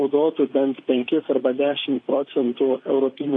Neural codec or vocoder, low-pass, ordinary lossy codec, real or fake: vocoder, 44.1 kHz, 128 mel bands, Pupu-Vocoder; 9.9 kHz; AAC, 32 kbps; fake